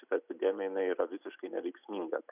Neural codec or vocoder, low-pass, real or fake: none; 3.6 kHz; real